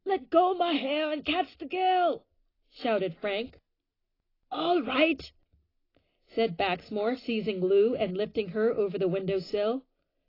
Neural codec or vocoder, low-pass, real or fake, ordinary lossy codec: none; 5.4 kHz; real; AAC, 24 kbps